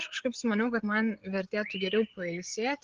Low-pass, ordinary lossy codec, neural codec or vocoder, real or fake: 7.2 kHz; Opus, 16 kbps; codec, 16 kHz, 8 kbps, FreqCodec, larger model; fake